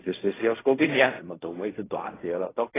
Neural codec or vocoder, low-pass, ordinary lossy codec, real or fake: codec, 16 kHz in and 24 kHz out, 0.4 kbps, LongCat-Audio-Codec, fine tuned four codebook decoder; 3.6 kHz; AAC, 16 kbps; fake